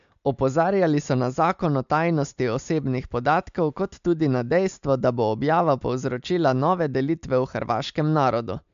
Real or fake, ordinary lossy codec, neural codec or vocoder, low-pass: real; MP3, 64 kbps; none; 7.2 kHz